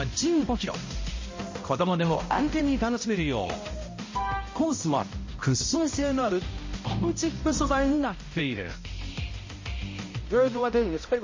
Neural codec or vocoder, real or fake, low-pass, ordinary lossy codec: codec, 16 kHz, 0.5 kbps, X-Codec, HuBERT features, trained on balanced general audio; fake; 7.2 kHz; MP3, 32 kbps